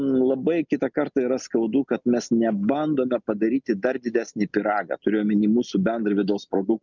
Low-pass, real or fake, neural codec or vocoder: 7.2 kHz; real; none